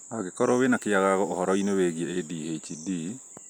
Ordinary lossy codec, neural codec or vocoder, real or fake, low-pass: none; none; real; none